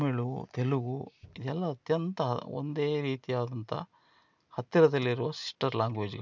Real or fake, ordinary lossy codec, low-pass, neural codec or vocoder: real; none; 7.2 kHz; none